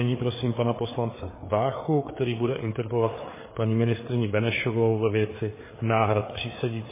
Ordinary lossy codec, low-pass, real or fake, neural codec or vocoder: MP3, 16 kbps; 3.6 kHz; fake; codec, 16 kHz, 4 kbps, FreqCodec, larger model